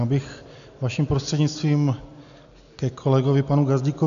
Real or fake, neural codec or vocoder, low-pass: real; none; 7.2 kHz